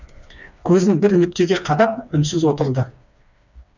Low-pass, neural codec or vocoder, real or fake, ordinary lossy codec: 7.2 kHz; codec, 16 kHz, 2 kbps, FreqCodec, smaller model; fake; none